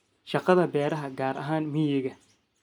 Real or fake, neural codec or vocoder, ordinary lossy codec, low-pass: real; none; none; 19.8 kHz